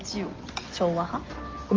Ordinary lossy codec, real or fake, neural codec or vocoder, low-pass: Opus, 32 kbps; real; none; 7.2 kHz